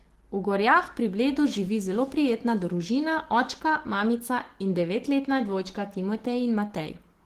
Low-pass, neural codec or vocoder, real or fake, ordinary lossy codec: 14.4 kHz; codec, 44.1 kHz, 7.8 kbps, DAC; fake; Opus, 16 kbps